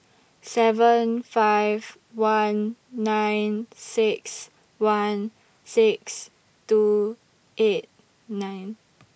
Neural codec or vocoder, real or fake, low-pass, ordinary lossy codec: none; real; none; none